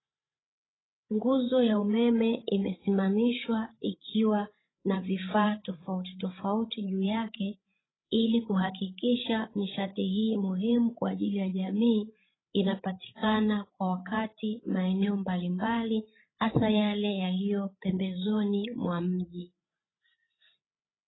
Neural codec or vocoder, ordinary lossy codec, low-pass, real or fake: codec, 16 kHz, 16 kbps, FreqCodec, larger model; AAC, 16 kbps; 7.2 kHz; fake